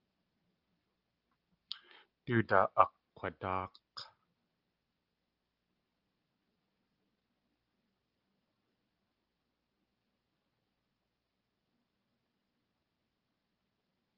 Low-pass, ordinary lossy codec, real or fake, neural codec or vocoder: 5.4 kHz; Opus, 32 kbps; real; none